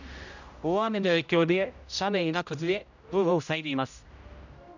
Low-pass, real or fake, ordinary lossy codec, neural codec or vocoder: 7.2 kHz; fake; none; codec, 16 kHz, 0.5 kbps, X-Codec, HuBERT features, trained on general audio